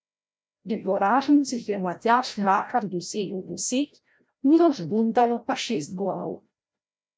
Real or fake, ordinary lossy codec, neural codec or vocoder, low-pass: fake; none; codec, 16 kHz, 0.5 kbps, FreqCodec, larger model; none